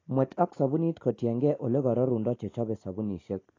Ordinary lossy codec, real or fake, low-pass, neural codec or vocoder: AAC, 32 kbps; real; 7.2 kHz; none